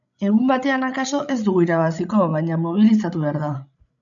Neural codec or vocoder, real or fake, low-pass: codec, 16 kHz, 16 kbps, FreqCodec, larger model; fake; 7.2 kHz